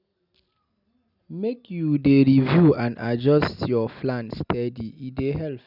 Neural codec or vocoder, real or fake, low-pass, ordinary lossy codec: none; real; 5.4 kHz; none